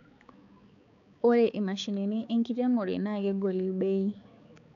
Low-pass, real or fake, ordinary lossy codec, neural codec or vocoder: 7.2 kHz; fake; none; codec, 16 kHz, 4 kbps, X-Codec, HuBERT features, trained on balanced general audio